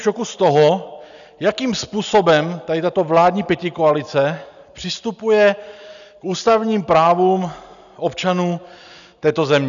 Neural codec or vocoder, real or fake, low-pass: none; real; 7.2 kHz